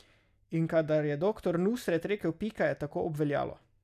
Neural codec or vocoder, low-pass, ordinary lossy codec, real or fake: none; 14.4 kHz; none; real